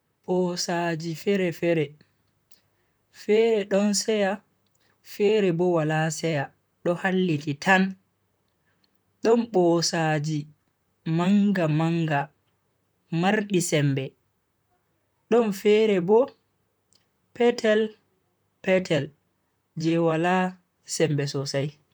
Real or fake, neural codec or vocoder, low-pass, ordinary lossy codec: fake; vocoder, 44.1 kHz, 128 mel bands, Pupu-Vocoder; none; none